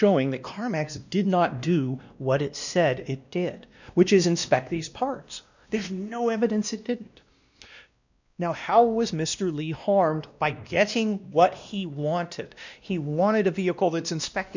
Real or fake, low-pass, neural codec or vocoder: fake; 7.2 kHz; codec, 16 kHz, 1 kbps, X-Codec, WavLM features, trained on Multilingual LibriSpeech